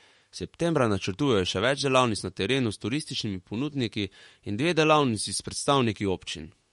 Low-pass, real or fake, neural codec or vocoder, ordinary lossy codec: 19.8 kHz; fake; autoencoder, 48 kHz, 128 numbers a frame, DAC-VAE, trained on Japanese speech; MP3, 48 kbps